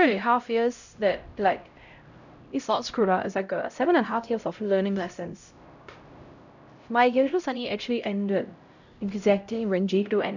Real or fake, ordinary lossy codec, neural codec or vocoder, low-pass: fake; none; codec, 16 kHz, 0.5 kbps, X-Codec, HuBERT features, trained on LibriSpeech; 7.2 kHz